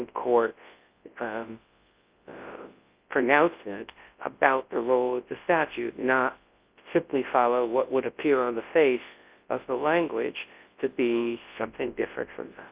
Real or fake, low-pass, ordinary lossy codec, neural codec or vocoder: fake; 3.6 kHz; Opus, 24 kbps; codec, 24 kHz, 0.9 kbps, WavTokenizer, large speech release